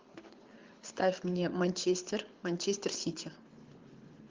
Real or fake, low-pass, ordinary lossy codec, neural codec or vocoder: fake; 7.2 kHz; Opus, 32 kbps; codec, 24 kHz, 6 kbps, HILCodec